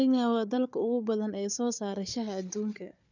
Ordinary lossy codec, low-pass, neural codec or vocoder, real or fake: none; 7.2 kHz; codec, 16 kHz, 4 kbps, FunCodec, trained on Chinese and English, 50 frames a second; fake